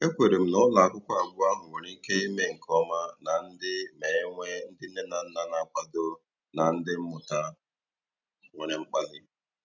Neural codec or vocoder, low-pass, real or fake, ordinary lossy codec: none; 7.2 kHz; real; none